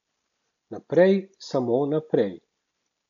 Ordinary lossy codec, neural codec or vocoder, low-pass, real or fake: none; none; 7.2 kHz; real